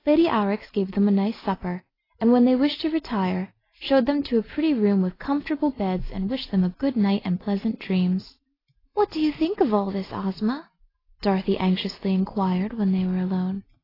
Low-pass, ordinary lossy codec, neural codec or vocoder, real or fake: 5.4 kHz; AAC, 24 kbps; none; real